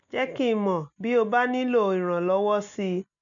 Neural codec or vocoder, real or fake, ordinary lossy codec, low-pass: none; real; none; 7.2 kHz